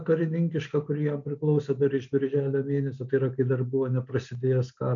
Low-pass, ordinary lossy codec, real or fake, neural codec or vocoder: 7.2 kHz; AAC, 64 kbps; real; none